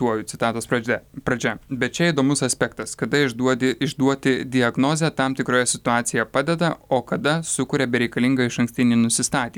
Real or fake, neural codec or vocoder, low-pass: real; none; 19.8 kHz